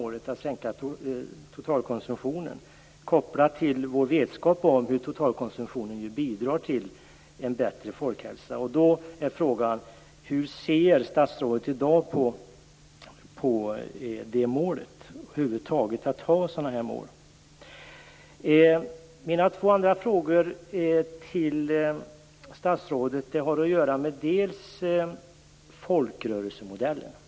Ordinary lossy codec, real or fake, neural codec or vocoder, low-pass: none; real; none; none